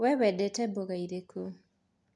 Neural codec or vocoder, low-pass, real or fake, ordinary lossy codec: none; 10.8 kHz; real; MP3, 64 kbps